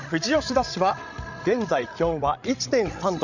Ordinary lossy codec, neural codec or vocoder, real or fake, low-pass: none; codec, 16 kHz, 16 kbps, FreqCodec, larger model; fake; 7.2 kHz